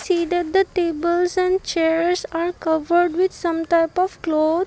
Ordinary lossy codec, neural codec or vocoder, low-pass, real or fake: none; none; none; real